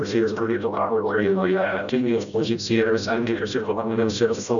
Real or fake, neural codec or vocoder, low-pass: fake; codec, 16 kHz, 0.5 kbps, FreqCodec, smaller model; 7.2 kHz